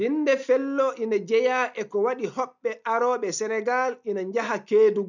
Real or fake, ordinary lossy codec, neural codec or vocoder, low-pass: real; none; none; 7.2 kHz